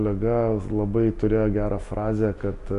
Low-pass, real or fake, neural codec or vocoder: 10.8 kHz; real; none